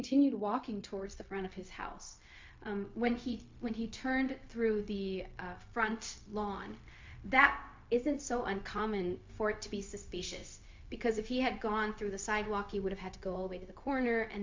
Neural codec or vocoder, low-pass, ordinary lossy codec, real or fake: codec, 16 kHz, 0.4 kbps, LongCat-Audio-Codec; 7.2 kHz; MP3, 48 kbps; fake